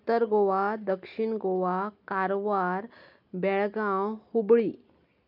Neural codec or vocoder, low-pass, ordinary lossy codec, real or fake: none; 5.4 kHz; none; real